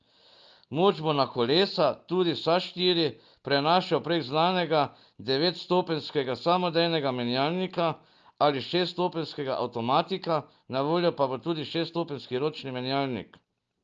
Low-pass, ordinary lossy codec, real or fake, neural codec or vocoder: 7.2 kHz; Opus, 24 kbps; real; none